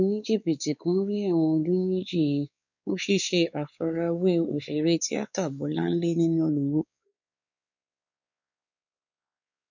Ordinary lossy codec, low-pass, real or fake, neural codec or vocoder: none; 7.2 kHz; fake; codec, 16 kHz, 4 kbps, X-Codec, WavLM features, trained on Multilingual LibriSpeech